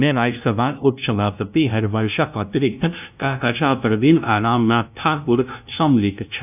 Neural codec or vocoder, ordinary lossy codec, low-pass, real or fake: codec, 16 kHz, 0.5 kbps, FunCodec, trained on LibriTTS, 25 frames a second; none; 3.6 kHz; fake